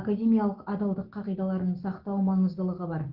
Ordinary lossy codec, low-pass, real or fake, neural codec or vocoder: Opus, 16 kbps; 5.4 kHz; real; none